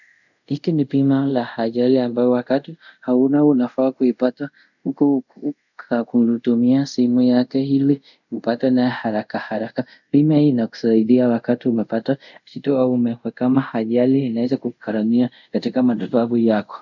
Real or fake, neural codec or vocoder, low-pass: fake; codec, 24 kHz, 0.5 kbps, DualCodec; 7.2 kHz